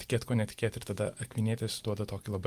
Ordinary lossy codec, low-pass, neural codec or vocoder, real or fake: Opus, 32 kbps; 14.4 kHz; none; real